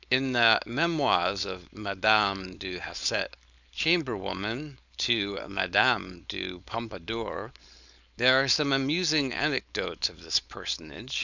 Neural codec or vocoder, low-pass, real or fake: codec, 16 kHz, 4.8 kbps, FACodec; 7.2 kHz; fake